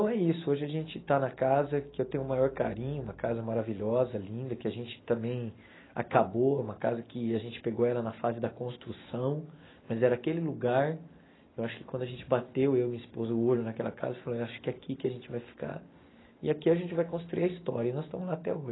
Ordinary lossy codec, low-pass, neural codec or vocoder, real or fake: AAC, 16 kbps; 7.2 kHz; none; real